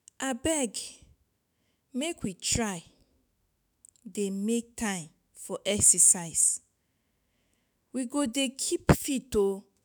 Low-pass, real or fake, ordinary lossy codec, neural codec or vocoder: none; fake; none; autoencoder, 48 kHz, 128 numbers a frame, DAC-VAE, trained on Japanese speech